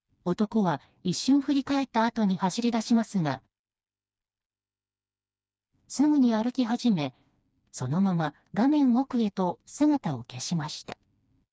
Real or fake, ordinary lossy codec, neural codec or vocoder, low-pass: fake; none; codec, 16 kHz, 2 kbps, FreqCodec, smaller model; none